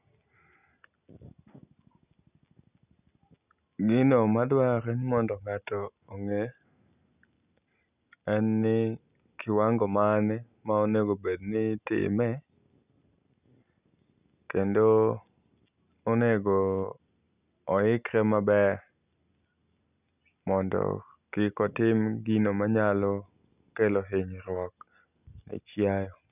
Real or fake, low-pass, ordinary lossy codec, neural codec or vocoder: real; 3.6 kHz; none; none